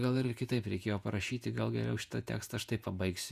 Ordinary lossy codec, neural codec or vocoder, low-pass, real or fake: Opus, 64 kbps; vocoder, 48 kHz, 128 mel bands, Vocos; 14.4 kHz; fake